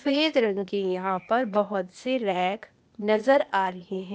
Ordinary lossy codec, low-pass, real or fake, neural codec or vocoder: none; none; fake; codec, 16 kHz, 0.8 kbps, ZipCodec